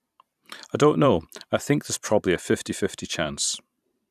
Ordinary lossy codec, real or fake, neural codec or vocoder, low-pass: none; fake; vocoder, 44.1 kHz, 128 mel bands every 512 samples, BigVGAN v2; 14.4 kHz